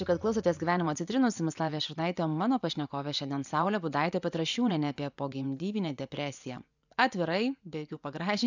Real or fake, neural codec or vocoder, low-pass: fake; vocoder, 24 kHz, 100 mel bands, Vocos; 7.2 kHz